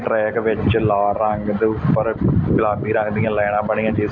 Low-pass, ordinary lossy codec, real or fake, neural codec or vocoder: 7.2 kHz; none; real; none